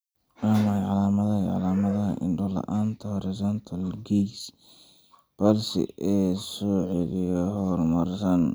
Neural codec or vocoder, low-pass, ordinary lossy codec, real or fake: none; none; none; real